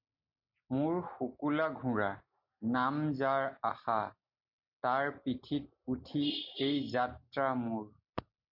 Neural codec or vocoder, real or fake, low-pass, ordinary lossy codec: none; real; 5.4 kHz; MP3, 48 kbps